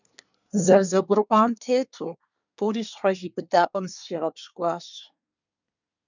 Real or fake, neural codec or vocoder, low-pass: fake; codec, 24 kHz, 1 kbps, SNAC; 7.2 kHz